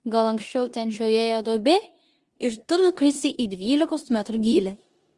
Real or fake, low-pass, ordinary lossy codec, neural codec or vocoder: fake; 10.8 kHz; Opus, 24 kbps; codec, 16 kHz in and 24 kHz out, 0.9 kbps, LongCat-Audio-Codec, four codebook decoder